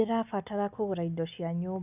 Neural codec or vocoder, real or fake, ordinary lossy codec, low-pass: none; real; none; 3.6 kHz